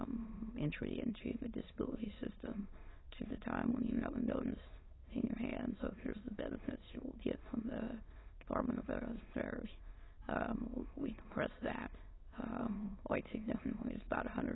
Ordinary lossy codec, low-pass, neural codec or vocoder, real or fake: AAC, 16 kbps; 7.2 kHz; autoencoder, 22.05 kHz, a latent of 192 numbers a frame, VITS, trained on many speakers; fake